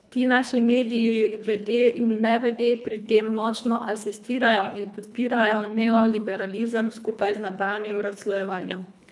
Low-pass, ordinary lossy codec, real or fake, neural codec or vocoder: none; none; fake; codec, 24 kHz, 1.5 kbps, HILCodec